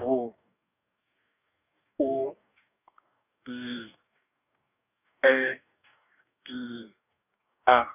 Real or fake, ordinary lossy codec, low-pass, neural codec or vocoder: fake; none; 3.6 kHz; codec, 44.1 kHz, 2.6 kbps, DAC